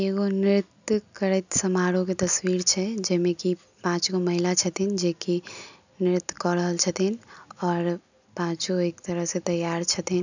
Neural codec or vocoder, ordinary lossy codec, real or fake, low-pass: none; none; real; 7.2 kHz